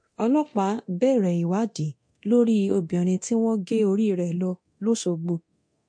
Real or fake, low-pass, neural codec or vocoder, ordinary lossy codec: fake; 9.9 kHz; codec, 24 kHz, 0.9 kbps, DualCodec; MP3, 48 kbps